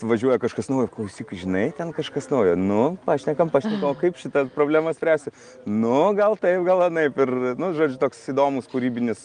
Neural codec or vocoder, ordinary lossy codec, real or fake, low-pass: none; Opus, 32 kbps; real; 9.9 kHz